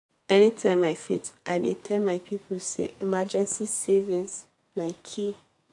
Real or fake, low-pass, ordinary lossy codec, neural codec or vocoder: fake; 10.8 kHz; AAC, 64 kbps; codec, 32 kHz, 1.9 kbps, SNAC